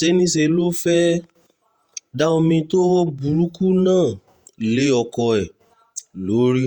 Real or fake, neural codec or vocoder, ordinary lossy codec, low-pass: fake; vocoder, 48 kHz, 128 mel bands, Vocos; Opus, 64 kbps; 19.8 kHz